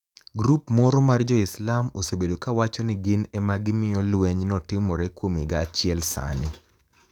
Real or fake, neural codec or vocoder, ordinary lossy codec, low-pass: fake; codec, 44.1 kHz, 7.8 kbps, DAC; none; 19.8 kHz